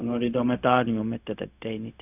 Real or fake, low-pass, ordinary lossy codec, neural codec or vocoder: fake; 3.6 kHz; none; codec, 16 kHz, 0.4 kbps, LongCat-Audio-Codec